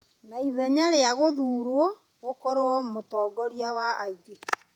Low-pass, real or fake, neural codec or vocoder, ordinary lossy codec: 19.8 kHz; fake; vocoder, 48 kHz, 128 mel bands, Vocos; none